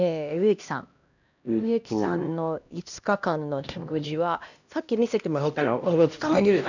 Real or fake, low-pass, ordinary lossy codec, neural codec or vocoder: fake; 7.2 kHz; none; codec, 16 kHz, 1 kbps, X-Codec, HuBERT features, trained on LibriSpeech